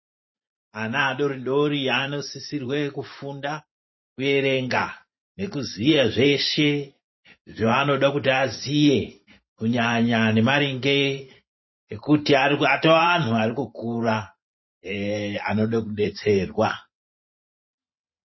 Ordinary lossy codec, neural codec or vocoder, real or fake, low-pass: MP3, 24 kbps; vocoder, 44.1 kHz, 128 mel bands every 512 samples, BigVGAN v2; fake; 7.2 kHz